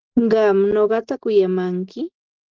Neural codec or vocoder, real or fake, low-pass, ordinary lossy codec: none; real; 7.2 kHz; Opus, 16 kbps